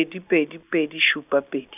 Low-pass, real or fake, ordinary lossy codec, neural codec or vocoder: 3.6 kHz; real; none; none